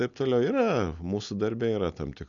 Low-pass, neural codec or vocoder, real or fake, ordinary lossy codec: 7.2 kHz; none; real; MP3, 96 kbps